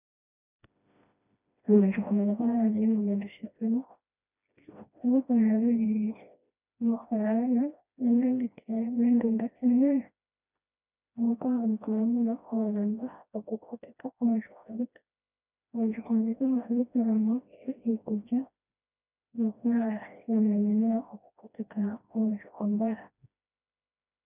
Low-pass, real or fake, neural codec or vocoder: 3.6 kHz; fake; codec, 16 kHz, 1 kbps, FreqCodec, smaller model